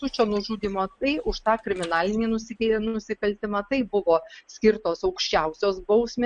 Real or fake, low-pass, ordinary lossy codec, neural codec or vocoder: real; 9.9 kHz; MP3, 64 kbps; none